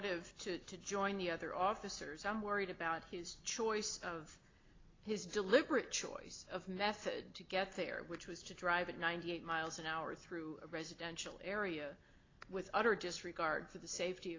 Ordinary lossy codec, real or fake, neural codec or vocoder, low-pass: AAC, 32 kbps; real; none; 7.2 kHz